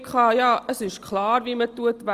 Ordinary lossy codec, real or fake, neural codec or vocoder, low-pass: Opus, 24 kbps; real; none; 14.4 kHz